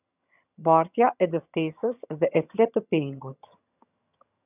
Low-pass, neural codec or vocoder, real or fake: 3.6 kHz; vocoder, 22.05 kHz, 80 mel bands, HiFi-GAN; fake